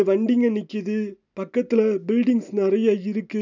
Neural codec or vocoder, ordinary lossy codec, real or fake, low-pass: none; none; real; 7.2 kHz